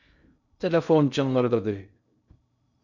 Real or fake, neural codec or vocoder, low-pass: fake; codec, 16 kHz in and 24 kHz out, 0.6 kbps, FocalCodec, streaming, 2048 codes; 7.2 kHz